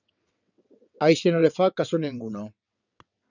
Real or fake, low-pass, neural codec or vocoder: fake; 7.2 kHz; vocoder, 22.05 kHz, 80 mel bands, WaveNeXt